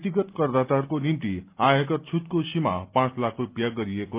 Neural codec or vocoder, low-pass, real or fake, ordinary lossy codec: none; 3.6 kHz; real; Opus, 32 kbps